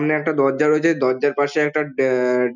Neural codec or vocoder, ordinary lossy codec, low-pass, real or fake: none; none; 7.2 kHz; real